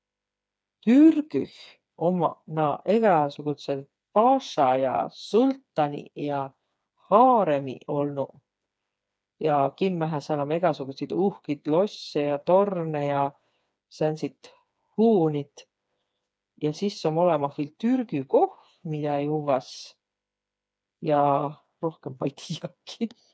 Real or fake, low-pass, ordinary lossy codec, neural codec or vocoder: fake; none; none; codec, 16 kHz, 4 kbps, FreqCodec, smaller model